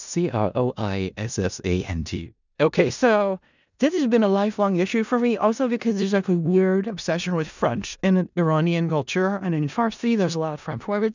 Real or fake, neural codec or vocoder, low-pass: fake; codec, 16 kHz in and 24 kHz out, 0.4 kbps, LongCat-Audio-Codec, four codebook decoder; 7.2 kHz